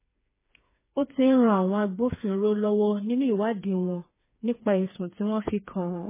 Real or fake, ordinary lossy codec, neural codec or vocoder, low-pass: fake; MP3, 16 kbps; codec, 16 kHz, 4 kbps, FreqCodec, smaller model; 3.6 kHz